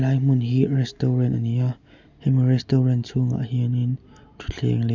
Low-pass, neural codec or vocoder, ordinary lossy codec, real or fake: 7.2 kHz; none; none; real